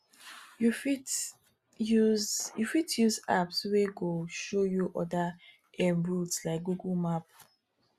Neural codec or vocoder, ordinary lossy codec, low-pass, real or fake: none; Opus, 64 kbps; 14.4 kHz; real